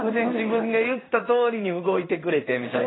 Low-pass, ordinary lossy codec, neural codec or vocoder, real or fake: 7.2 kHz; AAC, 16 kbps; codec, 16 kHz in and 24 kHz out, 1 kbps, XY-Tokenizer; fake